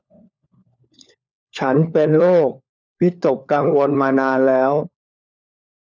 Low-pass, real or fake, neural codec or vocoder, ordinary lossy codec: none; fake; codec, 16 kHz, 4 kbps, FunCodec, trained on LibriTTS, 50 frames a second; none